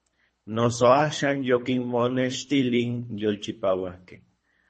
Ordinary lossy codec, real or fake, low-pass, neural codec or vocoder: MP3, 32 kbps; fake; 10.8 kHz; codec, 24 kHz, 3 kbps, HILCodec